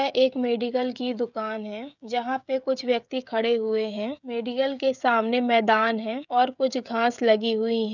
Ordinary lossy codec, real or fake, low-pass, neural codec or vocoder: none; fake; 7.2 kHz; codec, 16 kHz, 16 kbps, FreqCodec, smaller model